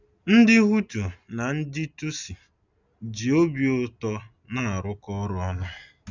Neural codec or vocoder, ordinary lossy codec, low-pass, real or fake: none; none; 7.2 kHz; real